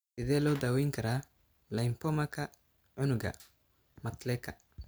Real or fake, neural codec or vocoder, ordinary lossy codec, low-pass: real; none; none; none